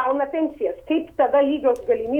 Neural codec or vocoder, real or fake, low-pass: autoencoder, 48 kHz, 128 numbers a frame, DAC-VAE, trained on Japanese speech; fake; 19.8 kHz